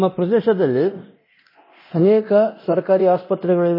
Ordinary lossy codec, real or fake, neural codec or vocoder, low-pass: MP3, 24 kbps; fake; codec, 24 kHz, 0.9 kbps, DualCodec; 5.4 kHz